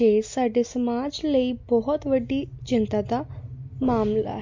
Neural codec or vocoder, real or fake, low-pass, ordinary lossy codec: none; real; 7.2 kHz; MP3, 48 kbps